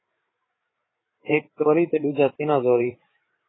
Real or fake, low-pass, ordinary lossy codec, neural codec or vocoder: fake; 7.2 kHz; AAC, 16 kbps; codec, 16 kHz, 8 kbps, FreqCodec, larger model